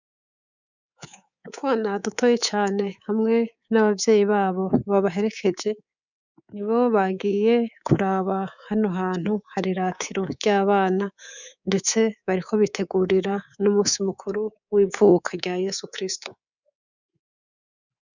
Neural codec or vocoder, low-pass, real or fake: codec, 24 kHz, 3.1 kbps, DualCodec; 7.2 kHz; fake